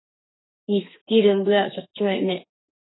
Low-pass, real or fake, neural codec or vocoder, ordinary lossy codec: 7.2 kHz; fake; codec, 32 kHz, 1.9 kbps, SNAC; AAC, 16 kbps